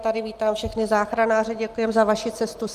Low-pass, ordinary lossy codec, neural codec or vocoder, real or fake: 14.4 kHz; Opus, 32 kbps; none; real